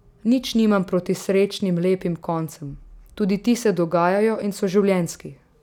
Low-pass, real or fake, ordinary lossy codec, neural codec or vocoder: 19.8 kHz; real; none; none